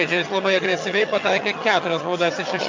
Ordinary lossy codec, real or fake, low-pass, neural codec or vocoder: MP3, 48 kbps; fake; 7.2 kHz; vocoder, 22.05 kHz, 80 mel bands, HiFi-GAN